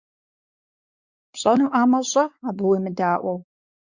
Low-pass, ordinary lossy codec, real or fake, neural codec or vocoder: 7.2 kHz; Opus, 64 kbps; fake; codec, 16 kHz, 4 kbps, X-Codec, WavLM features, trained on Multilingual LibriSpeech